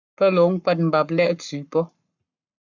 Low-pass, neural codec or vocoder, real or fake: 7.2 kHz; codec, 44.1 kHz, 7.8 kbps, Pupu-Codec; fake